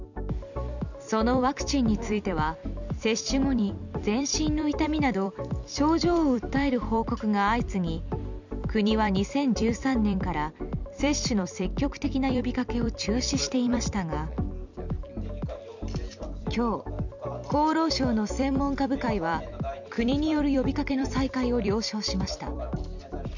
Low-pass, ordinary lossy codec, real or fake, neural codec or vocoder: 7.2 kHz; none; fake; vocoder, 44.1 kHz, 128 mel bands every 256 samples, BigVGAN v2